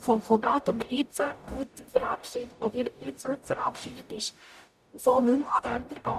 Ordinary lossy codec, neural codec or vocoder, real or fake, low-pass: none; codec, 44.1 kHz, 0.9 kbps, DAC; fake; 14.4 kHz